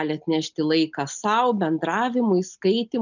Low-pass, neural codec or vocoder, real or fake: 7.2 kHz; none; real